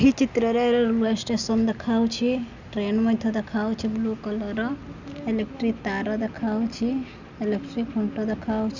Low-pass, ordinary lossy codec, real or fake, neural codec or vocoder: 7.2 kHz; none; real; none